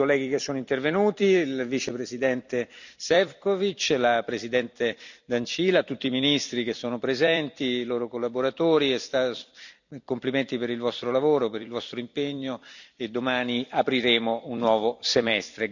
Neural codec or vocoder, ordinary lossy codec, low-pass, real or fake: vocoder, 44.1 kHz, 128 mel bands every 256 samples, BigVGAN v2; none; 7.2 kHz; fake